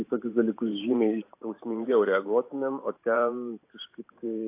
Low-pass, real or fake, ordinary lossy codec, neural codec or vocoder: 3.6 kHz; fake; AAC, 24 kbps; vocoder, 44.1 kHz, 128 mel bands every 512 samples, BigVGAN v2